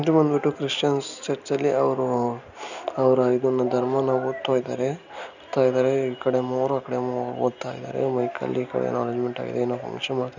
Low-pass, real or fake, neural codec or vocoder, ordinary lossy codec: 7.2 kHz; real; none; none